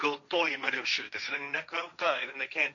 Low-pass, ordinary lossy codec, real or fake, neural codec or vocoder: 7.2 kHz; MP3, 48 kbps; fake; codec, 16 kHz, 1.1 kbps, Voila-Tokenizer